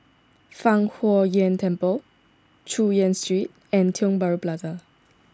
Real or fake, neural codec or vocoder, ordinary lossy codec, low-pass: real; none; none; none